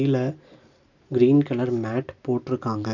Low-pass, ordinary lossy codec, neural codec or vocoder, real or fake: 7.2 kHz; none; none; real